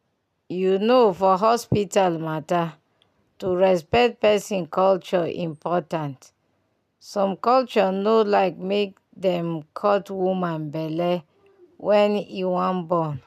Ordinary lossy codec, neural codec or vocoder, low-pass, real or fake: none; none; 14.4 kHz; real